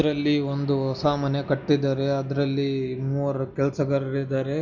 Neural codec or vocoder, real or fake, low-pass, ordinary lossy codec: none; real; 7.2 kHz; none